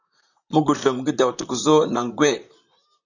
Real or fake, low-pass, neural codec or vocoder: fake; 7.2 kHz; vocoder, 44.1 kHz, 128 mel bands, Pupu-Vocoder